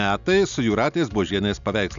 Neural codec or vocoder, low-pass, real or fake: none; 7.2 kHz; real